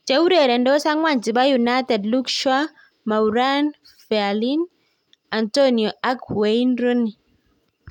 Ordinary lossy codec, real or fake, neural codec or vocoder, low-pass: none; real; none; 19.8 kHz